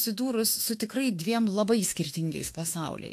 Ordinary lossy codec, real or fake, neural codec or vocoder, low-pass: AAC, 64 kbps; fake; autoencoder, 48 kHz, 32 numbers a frame, DAC-VAE, trained on Japanese speech; 14.4 kHz